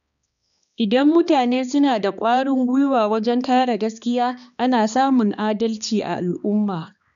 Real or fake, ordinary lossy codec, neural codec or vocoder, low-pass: fake; none; codec, 16 kHz, 2 kbps, X-Codec, HuBERT features, trained on balanced general audio; 7.2 kHz